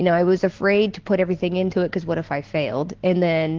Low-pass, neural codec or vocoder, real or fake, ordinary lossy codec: 7.2 kHz; none; real; Opus, 16 kbps